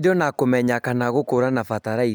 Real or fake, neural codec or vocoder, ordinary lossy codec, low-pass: real; none; none; none